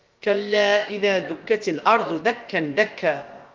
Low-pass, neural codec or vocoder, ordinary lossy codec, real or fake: 7.2 kHz; codec, 16 kHz, about 1 kbps, DyCAST, with the encoder's durations; Opus, 32 kbps; fake